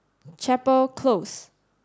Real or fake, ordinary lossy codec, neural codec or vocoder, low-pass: real; none; none; none